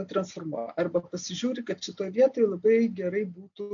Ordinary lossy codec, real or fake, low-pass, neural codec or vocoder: AAC, 64 kbps; real; 7.2 kHz; none